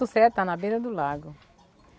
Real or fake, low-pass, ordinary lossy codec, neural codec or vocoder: real; none; none; none